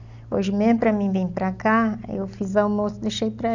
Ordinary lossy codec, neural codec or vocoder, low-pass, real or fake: none; none; 7.2 kHz; real